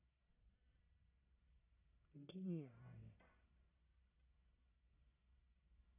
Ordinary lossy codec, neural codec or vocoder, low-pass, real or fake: none; codec, 44.1 kHz, 1.7 kbps, Pupu-Codec; 3.6 kHz; fake